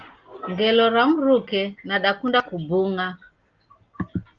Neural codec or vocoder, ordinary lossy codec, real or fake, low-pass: none; Opus, 32 kbps; real; 7.2 kHz